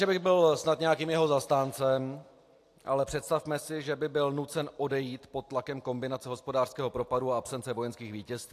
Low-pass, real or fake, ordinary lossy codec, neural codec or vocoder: 14.4 kHz; real; AAC, 64 kbps; none